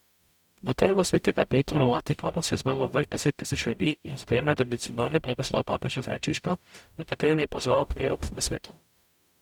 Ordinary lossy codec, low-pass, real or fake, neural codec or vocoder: none; 19.8 kHz; fake; codec, 44.1 kHz, 0.9 kbps, DAC